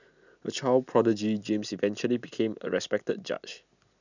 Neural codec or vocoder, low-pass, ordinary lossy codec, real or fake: none; 7.2 kHz; none; real